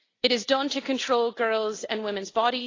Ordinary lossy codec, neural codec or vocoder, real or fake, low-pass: AAC, 32 kbps; none; real; 7.2 kHz